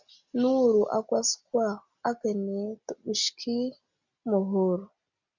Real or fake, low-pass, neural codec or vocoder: real; 7.2 kHz; none